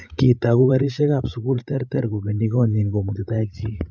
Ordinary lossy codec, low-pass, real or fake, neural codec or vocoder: none; none; fake; codec, 16 kHz, 16 kbps, FreqCodec, larger model